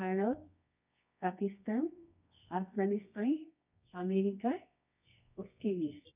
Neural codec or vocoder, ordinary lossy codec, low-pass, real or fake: codec, 24 kHz, 0.9 kbps, WavTokenizer, medium music audio release; none; 3.6 kHz; fake